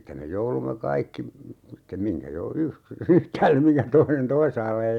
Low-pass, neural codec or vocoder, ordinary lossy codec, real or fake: 19.8 kHz; none; none; real